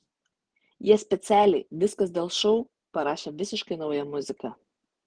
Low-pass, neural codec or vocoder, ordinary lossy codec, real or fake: 9.9 kHz; none; Opus, 16 kbps; real